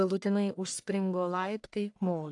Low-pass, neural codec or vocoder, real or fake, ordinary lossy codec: 10.8 kHz; codec, 44.1 kHz, 1.7 kbps, Pupu-Codec; fake; AAC, 64 kbps